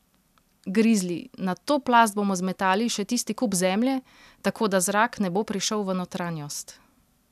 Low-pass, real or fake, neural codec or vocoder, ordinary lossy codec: 14.4 kHz; real; none; none